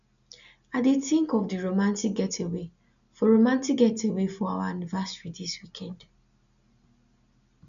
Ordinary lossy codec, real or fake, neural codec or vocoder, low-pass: none; real; none; 7.2 kHz